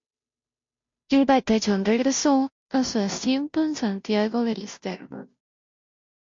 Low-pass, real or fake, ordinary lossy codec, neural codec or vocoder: 7.2 kHz; fake; MP3, 48 kbps; codec, 16 kHz, 0.5 kbps, FunCodec, trained on Chinese and English, 25 frames a second